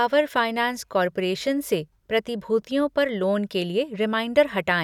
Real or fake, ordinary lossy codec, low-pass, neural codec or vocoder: real; none; 19.8 kHz; none